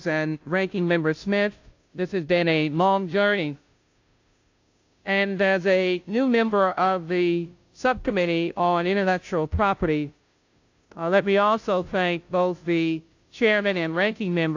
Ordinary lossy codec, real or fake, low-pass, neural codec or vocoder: AAC, 48 kbps; fake; 7.2 kHz; codec, 16 kHz, 0.5 kbps, FunCodec, trained on Chinese and English, 25 frames a second